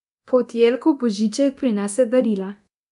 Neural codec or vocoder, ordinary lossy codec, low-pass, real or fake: codec, 24 kHz, 0.9 kbps, DualCodec; none; 10.8 kHz; fake